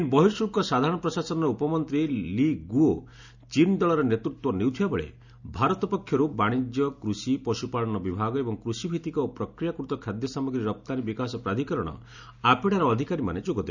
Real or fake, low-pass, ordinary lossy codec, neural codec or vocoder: real; 7.2 kHz; none; none